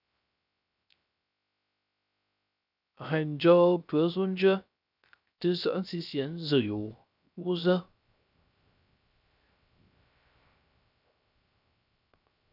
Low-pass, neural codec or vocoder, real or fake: 5.4 kHz; codec, 16 kHz, 0.3 kbps, FocalCodec; fake